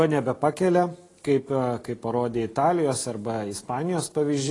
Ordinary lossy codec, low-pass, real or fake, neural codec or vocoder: AAC, 32 kbps; 10.8 kHz; real; none